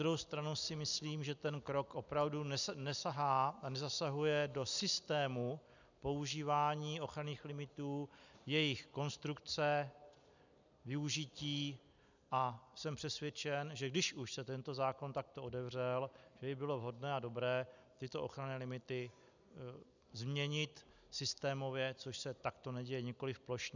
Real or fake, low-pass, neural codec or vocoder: real; 7.2 kHz; none